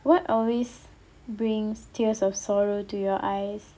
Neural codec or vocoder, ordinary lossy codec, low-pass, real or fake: none; none; none; real